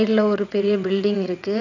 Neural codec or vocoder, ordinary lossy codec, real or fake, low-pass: vocoder, 22.05 kHz, 80 mel bands, WaveNeXt; none; fake; 7.2 kHz